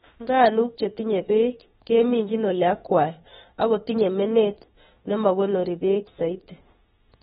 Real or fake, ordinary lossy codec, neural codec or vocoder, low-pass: fake; AAC, 16 kbps; autoencoder, 48 kHz, 32 numbers a frame, DAC-VAE, trained on Japanese speech; 19.8 kHz